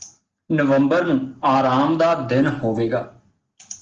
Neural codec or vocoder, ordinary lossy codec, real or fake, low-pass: none; Opus, 16 kbps; real; 7.2 kHz